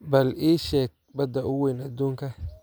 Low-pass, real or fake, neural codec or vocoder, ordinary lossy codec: none; real; none; none